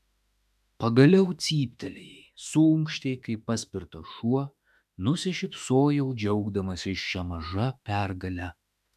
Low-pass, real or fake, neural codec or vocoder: 14.4 kHz; fake; autoencoder, 48 kHz, 32 numbers a frame, DAC-VAE, trained on Japanese speech